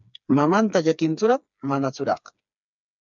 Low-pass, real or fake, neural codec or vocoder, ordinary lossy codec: 7.2 kHz; fake; codec, 16 kHz, 4 kbps, FreqCodec, smaller model; MP3, 64 kbps